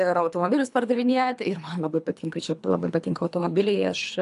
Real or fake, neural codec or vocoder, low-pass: fake; codec, 24 kHz, 3 kbps, HILCodec; 10.8 kHz